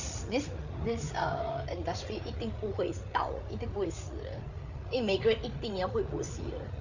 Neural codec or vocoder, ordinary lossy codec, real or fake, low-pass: codec, 16 kHz, 8 kbps, FreqCodec, larger model; none; fake; 7.2 kHz